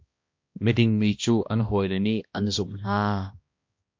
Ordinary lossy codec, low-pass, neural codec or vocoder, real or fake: MP3, 48 kbps; 7.2 kHz; codec, 16 kHz, 1 kbps, X-Codec, HuBERT features, trained on balanced general audio; fake